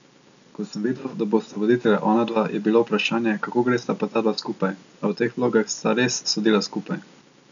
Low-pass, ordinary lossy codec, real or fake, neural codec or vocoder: 7.2 kHz; none; real; none